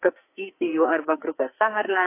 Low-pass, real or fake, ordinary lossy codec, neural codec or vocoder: 3.6 kHz; fake; AAC, 24 kbps; codec, 44.1 kHz, 2.6 kbps, SNAC